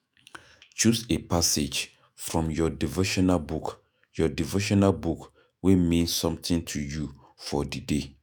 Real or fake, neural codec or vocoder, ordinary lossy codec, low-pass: fake; autoencoder, 48 kHz, 128 numbers a frame, DAC-VAE, trained on Japanese speech; none; none